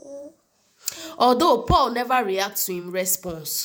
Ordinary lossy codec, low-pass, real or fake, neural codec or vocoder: none; none; fake; vocoder, 48 kHz, 128 mel bands, Vocos